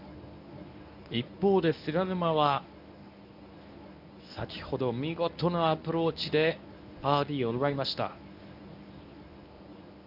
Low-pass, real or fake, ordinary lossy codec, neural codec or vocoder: 5.4 kHz; fake; none; codec, 24 kHz, 0.9 kbps, WavTokenizer, medium speech release version 1